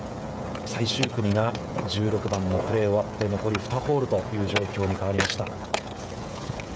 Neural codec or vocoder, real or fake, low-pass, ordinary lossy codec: codec, 16 kHz, 16 kbps, FreqCodec, smaller model; fake; none; none